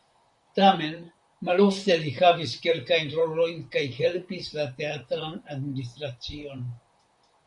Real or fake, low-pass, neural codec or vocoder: fake; 10.8 kHz; vocoder, 44.1 kHz, 128 mel bands, Pupu-Vocoder